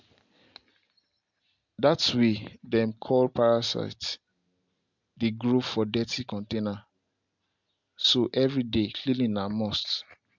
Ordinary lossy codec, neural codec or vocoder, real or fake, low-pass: MP3, 64 kbps; none; real; 7.2 kHz